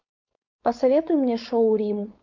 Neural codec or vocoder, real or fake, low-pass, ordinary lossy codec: codec, 16 kHz, 4.8 kbps, FACodec; fake; 7.2 kHz; MP3, 48 kbps